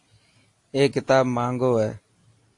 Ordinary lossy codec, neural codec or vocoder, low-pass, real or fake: AAC, 48 kbps; none; 10.8 kHz; real